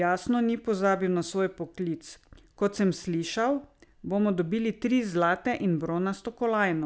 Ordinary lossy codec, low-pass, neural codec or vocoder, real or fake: none; none; none; real